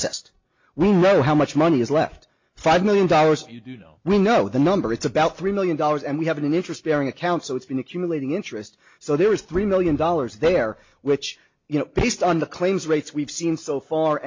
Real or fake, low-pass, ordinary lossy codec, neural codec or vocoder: real; 7.2 kHz; MP3, 48 kbps; none